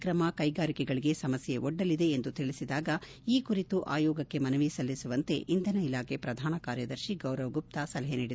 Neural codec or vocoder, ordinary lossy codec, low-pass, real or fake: none; none; none; real